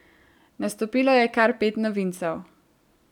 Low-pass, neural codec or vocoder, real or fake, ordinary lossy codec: 19.8 kHz; none; real; none